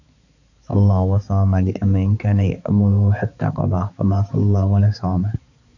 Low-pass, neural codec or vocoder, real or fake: 7.2 kHz; codec, 16 kHz, 4 kbps, X-Codec, HuBERT features, trained on balanced general audio; fake